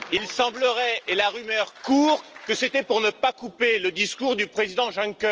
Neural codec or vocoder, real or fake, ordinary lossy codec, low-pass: none; real; Opus, 24 kbps; 7.2 kHz